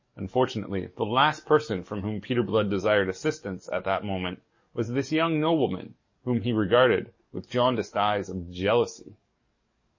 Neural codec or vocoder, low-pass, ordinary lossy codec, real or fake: codec, 44.1 kHz, 7.8 kbps, DAC; 7.2 kHz; MP3, 32 kbps; fake